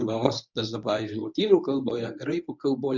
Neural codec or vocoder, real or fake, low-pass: codec, 24 kHz, 0.9 kbps, WavTokenizer, medium speech release version 1; fake; 7.2 kHz